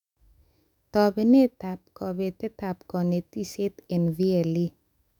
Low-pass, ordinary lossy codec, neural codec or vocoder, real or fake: 19.8 kHz; none; autoencoder, 48 kHz, 128 numbers a frame, DAC-VAE, trained on Japanese speech; fake